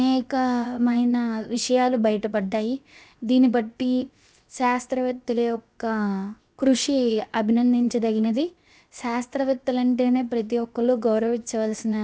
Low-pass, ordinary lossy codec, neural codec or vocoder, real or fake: none; none; codec, 16 kHz, 0.7 kbps, FocalCodec; fake